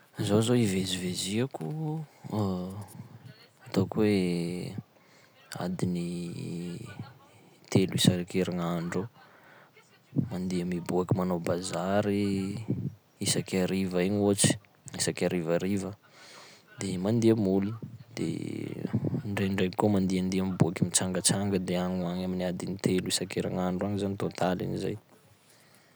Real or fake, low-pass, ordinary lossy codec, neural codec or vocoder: real; none; none; none